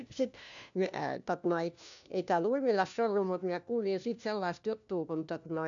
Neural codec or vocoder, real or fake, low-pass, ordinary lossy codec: codec, 16 kHz, 1 kbps, FunCodec, trained on LibriTTS, 50 frames a second; fake; 7.2 kHz; MP3, 96 kbps